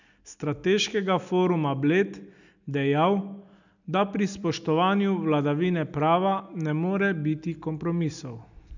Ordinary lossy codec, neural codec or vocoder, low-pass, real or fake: none; none; 7.2 kHz; real